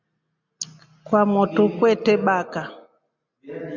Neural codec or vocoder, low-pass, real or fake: none; 7.2 kHz; real